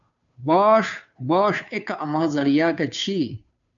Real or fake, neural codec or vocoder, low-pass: fake; codec, 16 kHz, 2 kbps, FunCodec, trained on Chinese and English, 25 frames a second; 7.2 kHz